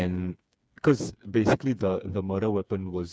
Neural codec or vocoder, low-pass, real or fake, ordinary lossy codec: codec, 16 kHz, 4 kbps, FreqCodec, smaller model; none; fake; none